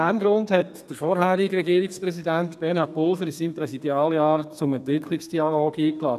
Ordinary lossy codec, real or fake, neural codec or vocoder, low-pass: none; fake; codec, 32 kHz, 1.9 kbps, SNAC; 14.4 kHz